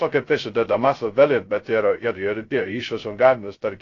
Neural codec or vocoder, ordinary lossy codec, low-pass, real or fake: codec, 16 kHz, 0.2 kbps, FocalCodec; AAC, 32 kbps; 7.2 kHz; fake